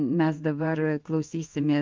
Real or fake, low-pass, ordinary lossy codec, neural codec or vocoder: fake; 7.2 kHz; Opus, 32 kbps; codec, 16 kHz in and 24 kHz out, 1 kbps, XY-Tokenizer